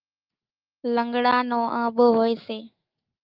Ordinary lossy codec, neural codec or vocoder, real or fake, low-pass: Opus, 32 kbps; autoencoder, 48 kHz, 128 numbers a frame, DAC-VAE, trained on Japanese speech; fake; 5.4 kHz